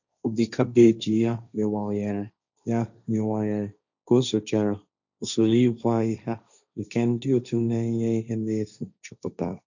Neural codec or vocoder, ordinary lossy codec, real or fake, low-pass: codec, 16 kHz, 1.1 kbps, Voila-Tokenizer; none; fake; 7.2 kHz